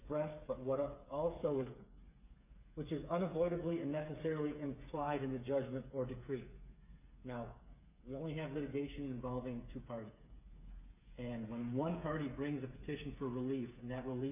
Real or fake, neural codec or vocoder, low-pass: fake; codec, 16 kHz, 8 kbps, FreqCodec, smaller model; 3.6 kHz